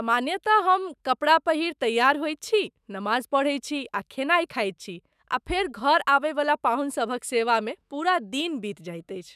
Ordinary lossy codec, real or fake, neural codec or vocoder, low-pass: none; fake; autoencoder, 48 kHz, 128 numbers a frame, DAC-VAE, trained on Japanese speech; 14.4 kHz